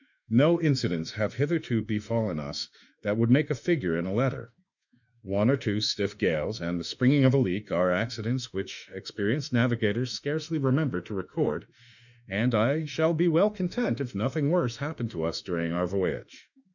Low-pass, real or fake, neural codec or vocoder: 7.2 kHz; fake; autoencoder, 48 kHz, 32 numbers a frame, DAC-VAE, trained on Japanese speech